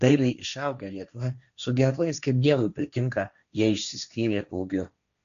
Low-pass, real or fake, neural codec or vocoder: 7.2 kHz; fake; codec, 16 kHz, 1.1 kbps, Voila-Tokenizer